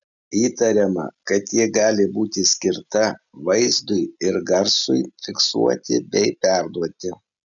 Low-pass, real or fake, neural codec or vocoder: 7.2 kHz; real; none